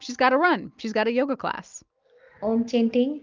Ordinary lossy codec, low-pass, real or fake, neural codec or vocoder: Opus, 32 kbps; 7.2 kHz; real; none